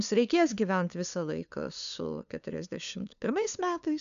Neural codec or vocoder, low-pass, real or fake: codec, 16 kHz, 2 kbps, FunCodec, trained on LibriTTS, 25 frames a second; 7.2 kHz; fake